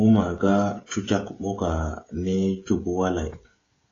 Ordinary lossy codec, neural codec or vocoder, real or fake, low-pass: AAC, 32 kbps; codec, 16 kHz, 16 kbps, FreqCodec, smaller model; fake; 7.2 kHz